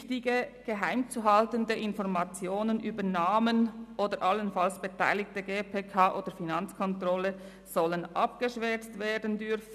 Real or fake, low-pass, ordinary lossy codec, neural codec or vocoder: real; 14.4 kHz; none; none